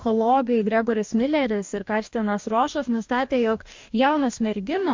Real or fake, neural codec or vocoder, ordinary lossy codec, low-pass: fake; codec, 44.1 kHz, 2.6 kbps, DAC; MP3, 48 kbps; 7.2 kHz